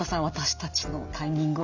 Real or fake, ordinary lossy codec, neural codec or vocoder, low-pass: real; none; none; 7.2 kHz